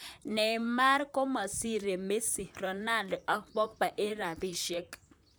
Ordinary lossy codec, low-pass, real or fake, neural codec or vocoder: none; none; fake; vocoder, 44.1 kHz, 128 mel bands, Pupu-Vocoder